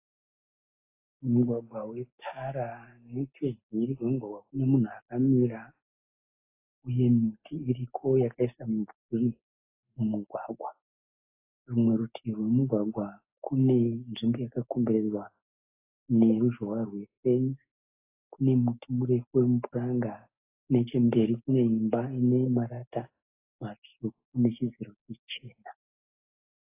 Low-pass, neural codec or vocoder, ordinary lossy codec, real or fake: 3.6 kHz; none; AAC, 24 kbps; real